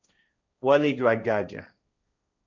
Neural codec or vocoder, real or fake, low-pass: codec, 16 kHz, 1.1 kbps, Voila-Tokenizer; fake; 7.2 kHz